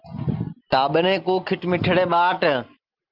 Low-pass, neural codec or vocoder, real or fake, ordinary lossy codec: 5.4 kHz; none; real; Opus, 24 kbps